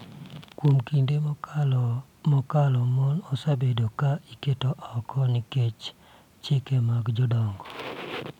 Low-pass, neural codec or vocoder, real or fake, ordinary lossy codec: 19.8 kHz; none; real; none